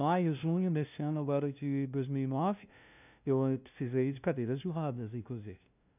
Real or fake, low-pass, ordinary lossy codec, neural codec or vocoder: fake; 3.6 kHz; none; codec, 16 kHz, 0.5 kbps, FunCodec, trained on LibriTTS, 25 frames a second